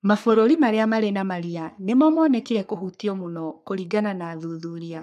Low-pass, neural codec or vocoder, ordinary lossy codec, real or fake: 14.4 kHz; codec, 44.1 kHz, 3.4 kbps, Pupu-Codec; none; fake